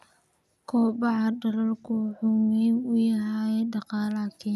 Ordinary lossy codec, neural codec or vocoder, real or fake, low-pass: none; none; real; 14.4 kHz